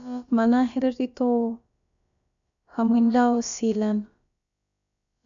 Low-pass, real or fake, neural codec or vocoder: 7.2 kHz; fake; codec, 16 kHz, about 1 kbps, DyCAST, with the encoder's durations